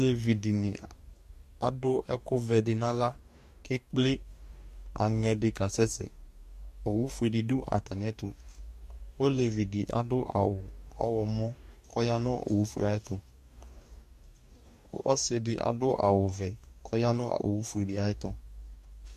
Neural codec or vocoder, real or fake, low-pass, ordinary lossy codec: codec, 44.1 kHz, 2.6 kbps, DAC; fake; 14.4 kHz; MP3, 64 kbps